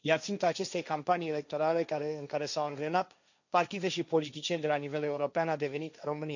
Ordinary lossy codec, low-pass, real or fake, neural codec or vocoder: none; 7.2 kHz; fake; codec, 16 kHz, 1.1 kbps, Voila-Tokenizer